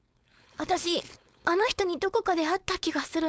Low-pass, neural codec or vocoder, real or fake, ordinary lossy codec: none; codec, 16 kHz, 4.8 kbps, FACodec; fake; none